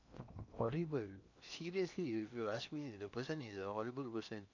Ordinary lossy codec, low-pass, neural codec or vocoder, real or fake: none; 7.2 kHz; codec, 16 kHz in and 24 kHz out, 0.8 kbps, FocalCodec, streaming, 65536 codes; fake